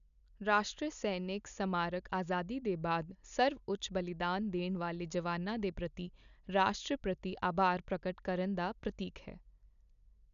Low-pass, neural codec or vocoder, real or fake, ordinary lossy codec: 7.2 kHz; none; real; none